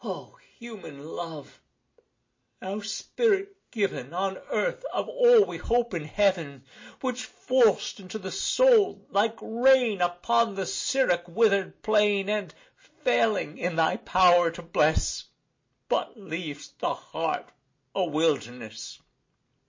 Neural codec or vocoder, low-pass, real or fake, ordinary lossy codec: none; 7.2 kHz; real; MP3, 32 kbps